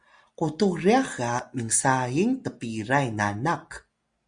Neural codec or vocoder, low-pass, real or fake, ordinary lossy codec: none; 9.9 kHz; real; Opus, 64 kbps